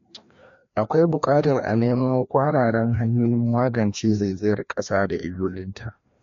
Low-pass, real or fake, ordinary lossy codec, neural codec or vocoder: 7.2 kHz; fake; MP3, 48 kbps; codec, 16 kHz, 1 kbps, FreqCodec, larger model